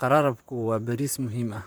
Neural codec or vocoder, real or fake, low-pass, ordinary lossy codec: vocoder, 44.1 kHz, 128 mel bands, Pupu-Vocoder; fake; none; none